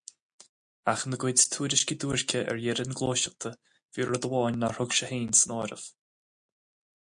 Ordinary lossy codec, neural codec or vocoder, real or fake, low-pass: MP3, 64 kbps; none; real; 9.9 kHz